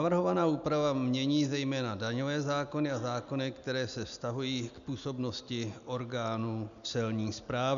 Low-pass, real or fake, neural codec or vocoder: 7.2 kHz; real; none